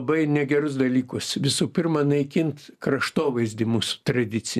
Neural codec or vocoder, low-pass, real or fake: none; 14.4 kHz; real